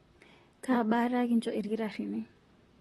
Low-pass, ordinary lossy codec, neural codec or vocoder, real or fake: 19.8 kHz; AAC, 32 kbps; vocoder, 44.1 kHz, 128 mel bands, Pupu-Vocoder; fake